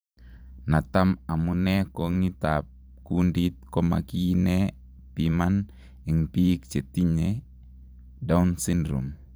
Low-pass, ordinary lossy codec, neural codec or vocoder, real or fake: none; none; vocoder, 44.1 kHz, 128 mel bands every 512 samples, BigVGAN v2; fake